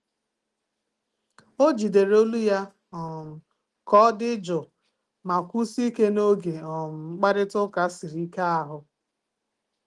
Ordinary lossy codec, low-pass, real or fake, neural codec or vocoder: Opus, 24 kbps; 10.8 kHz; real; none